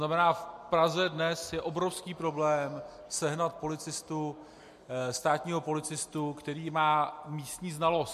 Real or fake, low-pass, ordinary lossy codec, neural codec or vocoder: real; 14.4 kHz; MP3, 64 kbps; none